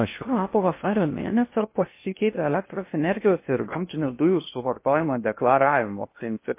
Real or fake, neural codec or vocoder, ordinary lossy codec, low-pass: fake; codec, 16 kHz in and 24 kHz out, 0.6 kbps, FocalCodec, streaming, 2048 codes; MP3, 24 kbps; 3.6 kHz